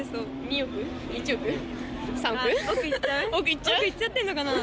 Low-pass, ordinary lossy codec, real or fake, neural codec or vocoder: none; none; real; none